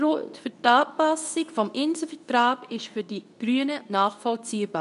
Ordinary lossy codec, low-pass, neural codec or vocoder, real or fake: none; 10.8 kHz; codec, 24 kHz, 0.9 kbps, WavTokenizer, medium speech release version 2; fake